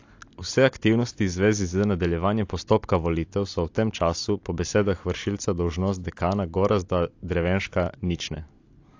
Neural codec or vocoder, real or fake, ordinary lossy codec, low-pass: none; real; AAC, 48 kbps; 7.2 kHz